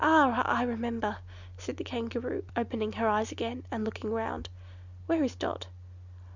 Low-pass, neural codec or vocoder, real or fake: 7.2 kHz; none; real